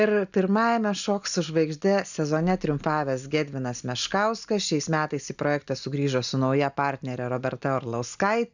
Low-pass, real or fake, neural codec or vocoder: 7.2 kHz; real; none